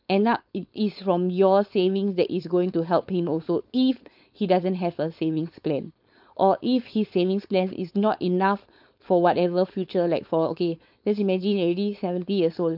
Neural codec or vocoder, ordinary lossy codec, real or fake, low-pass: codec, 16 kHz, 4.8 kbps, FACodec; none; fake; 5.4 kHz